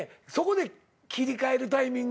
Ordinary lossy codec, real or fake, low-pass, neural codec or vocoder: none; real; none; none